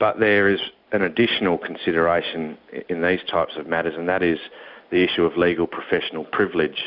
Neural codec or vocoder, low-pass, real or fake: none; 5.4 kHz; real